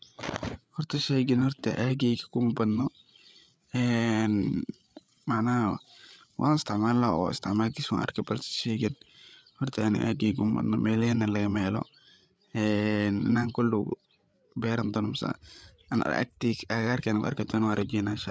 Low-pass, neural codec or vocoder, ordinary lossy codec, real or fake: none; codec, 16 kHz, 8 kbps, FreqCodec, larger model; none; fake